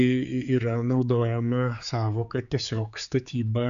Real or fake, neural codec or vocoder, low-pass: fake; codec, 16 kHz, 4 kbps, X-Codec, HuBERT features, trained on general audio; 7.2 kHz